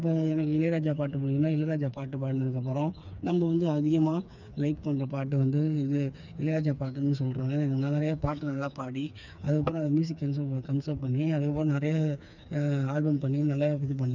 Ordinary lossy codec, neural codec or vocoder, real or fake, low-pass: none; codec, 16 kHz, 4 kbps, FreqCodec, smaller model; fake; 7.2 kHz